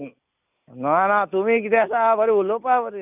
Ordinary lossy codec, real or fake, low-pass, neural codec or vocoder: none; real; 3.6 kHz; none